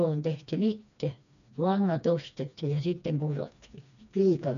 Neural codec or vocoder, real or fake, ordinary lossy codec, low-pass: codec, 16 kHz, 2 kbps, FreqCodec, smaller model; fake; none; 7.2 kHz